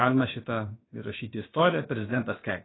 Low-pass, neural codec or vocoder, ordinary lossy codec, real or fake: 7.2 kHz; codec, 16 kHz, about 1 kbps, DyCAST, with the encoder's durations; AAC, 16 kbps; fake